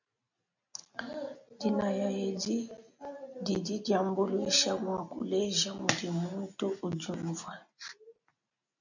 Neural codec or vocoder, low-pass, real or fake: none; 7.2 kHz; real